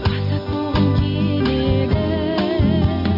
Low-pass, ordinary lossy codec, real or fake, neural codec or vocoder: 5.4 kHz; none; real; none